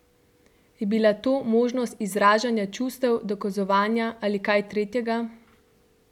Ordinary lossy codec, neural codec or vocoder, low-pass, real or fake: none; none; 19.8 kHz; real